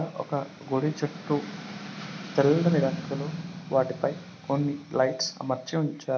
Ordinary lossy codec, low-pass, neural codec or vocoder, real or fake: none; none; none; real